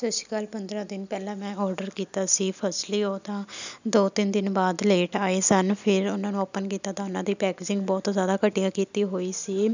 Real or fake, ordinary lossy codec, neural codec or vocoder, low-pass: real; none; none; 7.2 kHz